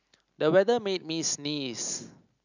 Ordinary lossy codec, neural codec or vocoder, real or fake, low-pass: none; none; real; 7.2 kHz